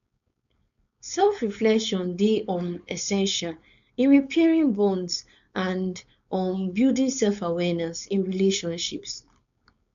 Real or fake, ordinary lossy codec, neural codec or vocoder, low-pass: fake; none; codec, 16 kHz, 4.8 kbps, FACodec; 7.2 kHz